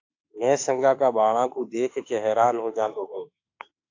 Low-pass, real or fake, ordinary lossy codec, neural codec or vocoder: 7.2 kHz; fake; AAC, 48 kbps; autoencoder, 48 kHz, 32 numbers a frame, DAC-VAE, trained on Japanese speech